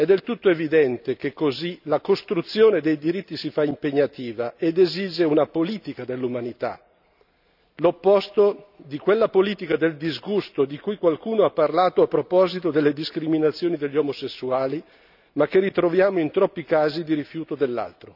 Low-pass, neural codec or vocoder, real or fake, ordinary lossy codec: 5.4 kHz; none; real; none